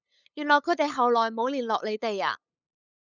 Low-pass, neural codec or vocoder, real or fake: 7.2 kHz; codec, 16 kHz, 8 kbps, FunCodec, trained on LibriTTS, 25 frames a second; fake